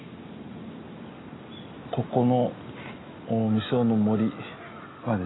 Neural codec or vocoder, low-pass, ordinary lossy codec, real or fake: none; 7.2 kHz; AAC, 16 kbps; real